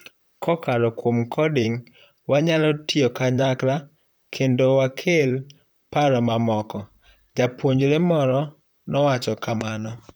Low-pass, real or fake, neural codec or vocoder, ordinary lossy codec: none; fake; vocoder, 44.1 kHz, 128 mel bands, Pupu-Vocoder; none